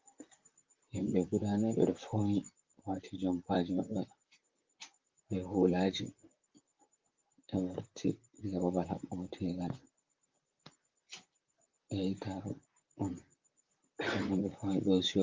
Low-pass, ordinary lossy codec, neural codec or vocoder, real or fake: 7.2 kHz; Opus, 32 kbps; vocoder, 44.1 kHz, 128 mel bands, Pupu-Vocoder; fake